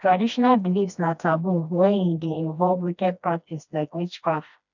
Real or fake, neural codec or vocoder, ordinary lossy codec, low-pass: fake; codec, 16 kHz, 1 kbps, FreqCodec, smaller model; none; 7.2 kHz